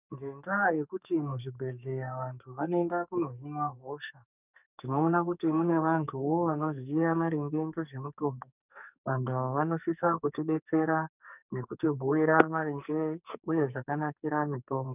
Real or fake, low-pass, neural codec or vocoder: fake; 3.6 kHz; codec, 44.1 kHz, 2.6 kbps, SNAC